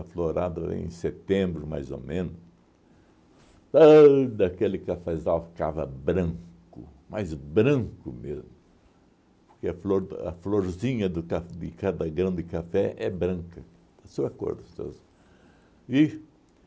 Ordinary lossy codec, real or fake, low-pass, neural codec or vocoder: none; real; none; none